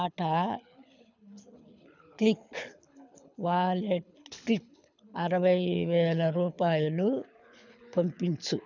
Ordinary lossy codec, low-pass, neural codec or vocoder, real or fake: none; 7.2 kHz; codec, 24 kHz, 6 kbps, HILCodec; fake